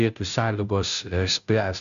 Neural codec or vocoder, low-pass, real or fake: codec, 16 kHz, 0.5 kbps, FunCodec, trained on Chinese and English, 25 frames a second; 7.2 kHz; fake